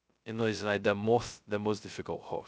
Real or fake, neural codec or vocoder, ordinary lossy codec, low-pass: fake; codec, 16 kHz, 0.2 kbps, FocalCodec; none; none